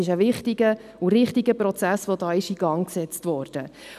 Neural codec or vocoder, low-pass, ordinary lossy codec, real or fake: none; 14.4 kHz; none; real